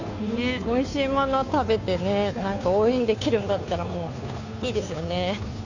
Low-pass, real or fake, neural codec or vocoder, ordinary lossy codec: 7.2 kHz; fake; codec, 16 kHz, 2 kbps, FunCodec, trained on Chinese and English, 25 frames a second; none